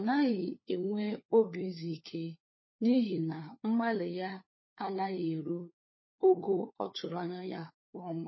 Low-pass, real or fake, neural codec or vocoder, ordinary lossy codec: 7.2 kHz; fake; codec, 16 kHz, 4 kbps, FunCodec, trained on LibriTTS, 50 frames a second; MP3, 24 kbps